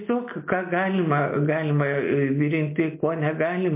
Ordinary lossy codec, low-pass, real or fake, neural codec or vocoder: MP3, 24 kbps; 3.6 kHz; real; none